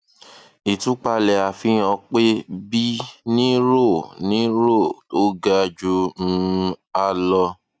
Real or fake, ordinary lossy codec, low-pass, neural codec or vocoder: real; none; none; none